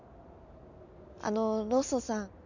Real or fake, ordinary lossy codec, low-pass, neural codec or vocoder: real; none; 7.2 kHz; none